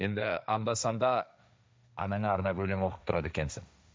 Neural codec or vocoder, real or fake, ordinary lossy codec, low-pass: codec, 16 kHz, 1.1 kbps, Voila-Tokenizer; fake; none; none